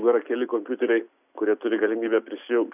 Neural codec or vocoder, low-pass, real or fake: none; 3.6 kHz; real